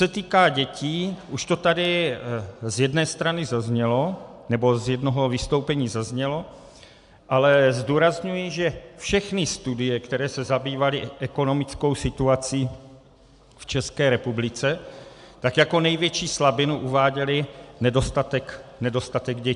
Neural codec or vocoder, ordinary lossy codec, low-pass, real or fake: none; AAC, 96 kbps; 10.8 kHz; real